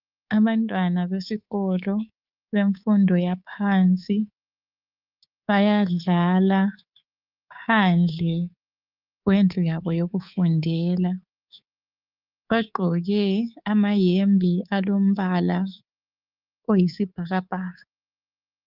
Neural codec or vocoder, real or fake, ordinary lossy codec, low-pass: codec, 24 kHz, 3.1 kbps, DualCodec; fake; Opus, 32 kbps; 5.4 kHz